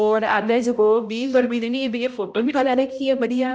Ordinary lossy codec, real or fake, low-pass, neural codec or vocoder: none; fake; none; codec, 16 kHz, 0.5 kbps, X-Codec, HuBERT features, trained on balanced general audio